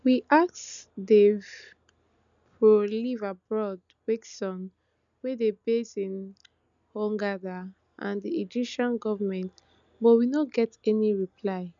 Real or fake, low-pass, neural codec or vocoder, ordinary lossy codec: real; 7.2 kHz; none; none